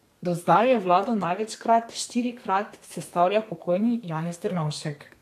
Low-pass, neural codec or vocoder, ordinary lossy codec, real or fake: 14.4 kHz; codec, 32 kHz, 1.9 kbps, SNAC; AAC, 64 kbps; fake